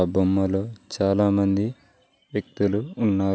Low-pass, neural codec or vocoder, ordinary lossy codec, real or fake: none; none; none; real